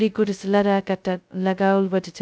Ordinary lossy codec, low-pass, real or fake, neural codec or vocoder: none; none; fake; codec, 16 kHz, 0.2 kbps, FocalCodec